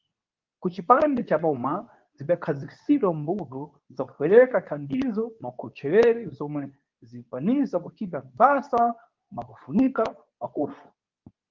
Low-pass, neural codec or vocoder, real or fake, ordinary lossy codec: 7.2 kHz; codec, 24 kHz, 0.9 kbps, WavTokenizer, medium speech release version 2; fake; Opus, 32 kbps